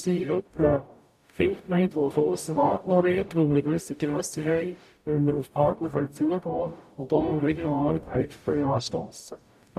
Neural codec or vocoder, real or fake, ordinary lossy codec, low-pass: codec, 44.1 kHz, 0.9 kbps, DAC; fake; AAC, 96 kbps; 14.4 kHz